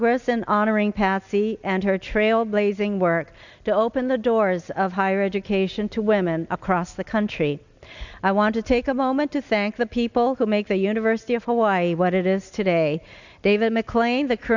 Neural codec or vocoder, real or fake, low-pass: none; real; 7.2 kHz